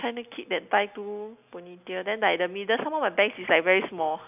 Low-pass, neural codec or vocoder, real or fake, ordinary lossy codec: 3.6 kHz; none; real; none